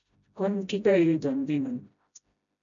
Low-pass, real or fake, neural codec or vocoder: 7.2 kHz; fake; codec, 16 kHz, 0.5 kbps, FreqCodec, smaller model